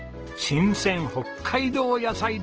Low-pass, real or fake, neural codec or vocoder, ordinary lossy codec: 7.2 kHz; real; none; Opus, 16 kbps